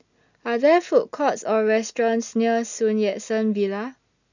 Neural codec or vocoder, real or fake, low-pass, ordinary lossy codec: none; real; 7.2 kHz; none